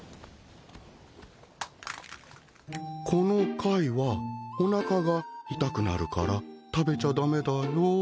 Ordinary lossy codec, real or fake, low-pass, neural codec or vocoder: none; real; none; none